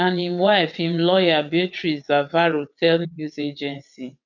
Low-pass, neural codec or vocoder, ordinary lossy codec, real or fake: 7.2 kHz; vocoder, 22.05 kHz, 80 mel bands, WaveNeXt; none; fake